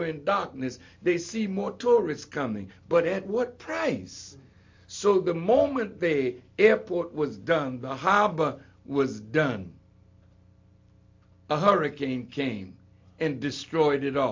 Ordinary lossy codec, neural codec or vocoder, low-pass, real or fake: MP3, 48 kbps; none; 7.2 kHz; real